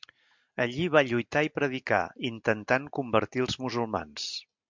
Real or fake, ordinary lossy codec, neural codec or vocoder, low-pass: real; MP3, 64 kbps; none; 7.2 kHz